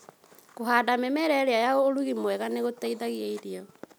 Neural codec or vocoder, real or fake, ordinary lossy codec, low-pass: none; real; none; none